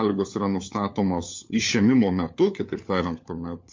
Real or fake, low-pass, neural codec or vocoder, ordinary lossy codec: fake; 7.2 kHz; codec, 16 kHz, 4 kbps, FunCodec, trained on LibriTTS, 50 frames a second; MP3, 48 kbps